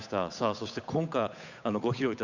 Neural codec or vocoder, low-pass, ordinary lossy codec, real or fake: codec, 16 kHz, 8 kbps, FunCodec, trained on Chinese and English, 25 frames a second; 7.2 kHz; none; fake